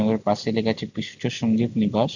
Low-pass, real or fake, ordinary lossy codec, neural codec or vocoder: 7.2 kHz; real; none; none